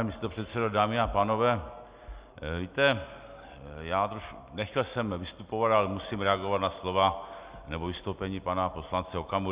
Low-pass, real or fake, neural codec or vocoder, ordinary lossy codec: 3.6 kHz; real; none; Opus, 64 kbps